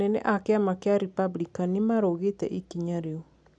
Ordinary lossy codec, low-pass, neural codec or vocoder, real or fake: none; 9.9 kHz; none; real